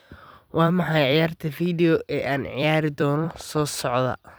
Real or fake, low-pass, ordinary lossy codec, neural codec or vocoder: fake; none; none; vocoder, 44.1 kHz, 128 mel bands every 256 samples, BigVGAN v2